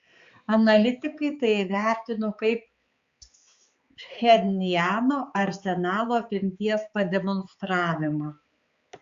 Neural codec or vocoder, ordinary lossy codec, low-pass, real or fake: codec, 16 kHz, 4 kbps, X-Codec, HuBERT features, trained on balanced general audio; Opus, 64 kbps; 7.2 kHz; fake